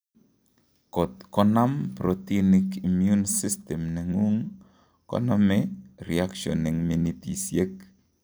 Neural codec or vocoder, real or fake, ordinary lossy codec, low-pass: none; real; none; none